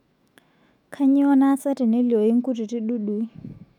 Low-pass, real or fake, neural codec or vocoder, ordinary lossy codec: 19.8 kHz; fake; autoencoder, 48 kHz, 128 numbers a frame, DAC-VAE, trained on Japanese speech; none